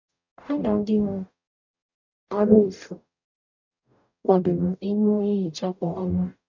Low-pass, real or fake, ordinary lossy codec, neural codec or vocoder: 7.2 kHz; fake; none; codec, 44.1 kHz, 0.9 kbps, DAC